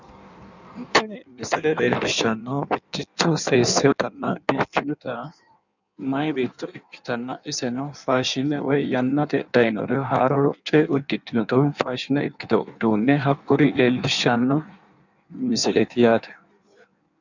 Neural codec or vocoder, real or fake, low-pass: codec, 16 kHz in and 24 kHz out, 1.1 kbps, FireRedTTS-2 codec; fake; 7.2 kHz